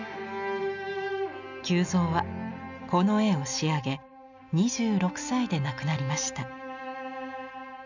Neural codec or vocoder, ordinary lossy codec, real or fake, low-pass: none; none; real; 7.2 kHz